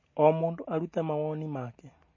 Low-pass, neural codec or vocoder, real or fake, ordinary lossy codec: 7.2 kHz; none; real; MP3, 32 kbps